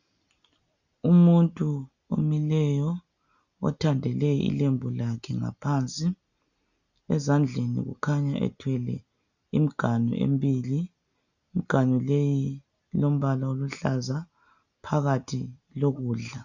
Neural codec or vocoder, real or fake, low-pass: none; real; 7.2 kHz